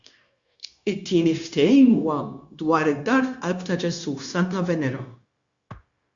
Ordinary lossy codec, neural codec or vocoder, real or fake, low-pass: Opus, 64 kbps; codec, 16 kHz, 0.9 kbps, LongCat-Audio-Codec; fake; 7.2 kHz